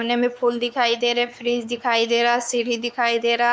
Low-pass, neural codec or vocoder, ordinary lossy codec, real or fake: none; codec, 16 kHz, 4 kbps, X-Codec, WavLM features, trained on Multilingual LibriSpeech; none; fake